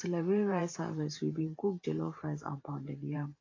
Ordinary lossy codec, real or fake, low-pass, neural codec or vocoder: AAC, 32 kbps; fake; 7.2 kHz; vocoder, 44.1 kHz, 128 mel bands every 512 samples, BigVGAN v2